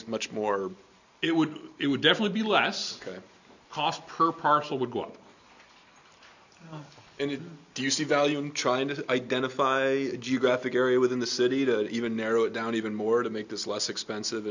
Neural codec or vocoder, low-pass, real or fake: none; 7.2 kHz; real